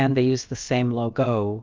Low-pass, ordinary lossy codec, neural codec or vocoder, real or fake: 7.2 kHz; Opus, 24 kbps; codec, 16 kHz, about 1 kbps, DyCAST, with the encoder's durations; fake